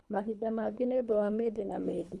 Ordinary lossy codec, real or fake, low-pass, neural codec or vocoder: none; fake; none; codec, 24 kHz, 3 kbps, HILCodec